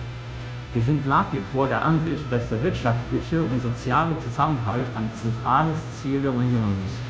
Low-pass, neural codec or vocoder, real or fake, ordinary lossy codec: none; codec, 16 kHz, 0.5 kbps, FunCodec, trained on Chinese and English, 25 frames a second; fake; none